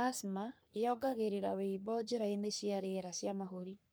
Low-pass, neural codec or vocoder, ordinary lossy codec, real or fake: none; codec, 44.1 kHz, 3.4 kbps, Pupu-Codec; none; fake